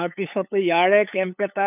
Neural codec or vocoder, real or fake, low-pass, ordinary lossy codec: codec, 16 kHz, 8 kbps, FunCodec, trained on LibriTTS, 25 frames a second; fake; 3.6 kHz; none